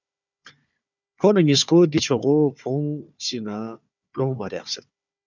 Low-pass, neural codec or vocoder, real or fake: 7.2 kHz; codec, 16 kHz, 4 kbps, FunCodec, trained on Chinese and English, 50 frames a second; fake